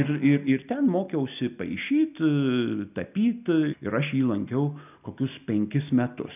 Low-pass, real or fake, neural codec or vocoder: 3.6 kHz; real; none